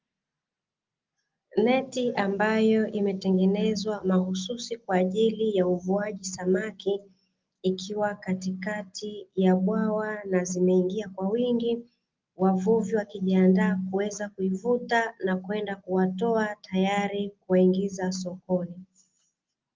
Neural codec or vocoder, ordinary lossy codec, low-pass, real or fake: none; Opus, 32 kbps; 7.2 kHz; real